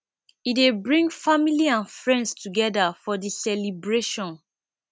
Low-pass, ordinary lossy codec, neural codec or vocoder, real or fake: none; none; none; real